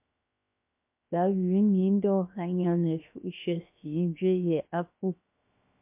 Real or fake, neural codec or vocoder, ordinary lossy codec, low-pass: fake; codec, 16 kHz, 0.7 kbps, FocalCodec; MP3, 32 kbps; 3.6 kHz